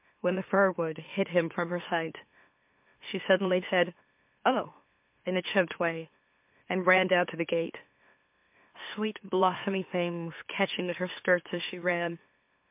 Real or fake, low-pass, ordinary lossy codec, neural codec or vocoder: fake; 3.6 kHz; MP3, 32 kbps; autoencoder, 44.1 kHz, a latent of 192 numbers a frame, MeloTTS